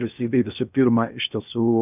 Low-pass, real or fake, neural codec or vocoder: 3.6 kHz; fake; codec, 16 kHz in and 24 kHz out, 0.8 kbps, FocalCodec, streaming, 65536 codes